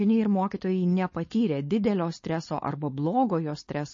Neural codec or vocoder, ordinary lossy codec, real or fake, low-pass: codec, 16 kHz, 4.8 kbps, FACodec; MP3, 32 kbps; fake; 7.2 kHz